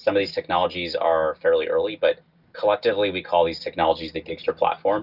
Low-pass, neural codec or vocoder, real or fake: 5.4 kHz; none; real